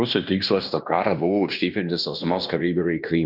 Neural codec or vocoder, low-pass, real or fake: codec, 16 kHz in and 24 kHz out, 0.9 kbps, LongCat-Audio-Codec, fine tuned four codebook decoder; 5.4 kHz; fake